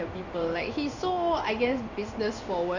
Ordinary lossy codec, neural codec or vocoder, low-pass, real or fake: none; codec, 16 kHz in and 24 kHz out, 1 kbps, XY-Tokenizer; 7.2 kHz; fake